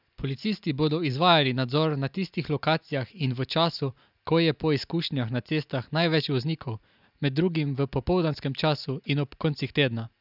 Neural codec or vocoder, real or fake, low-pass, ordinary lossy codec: none; real; 5.4 kHz; none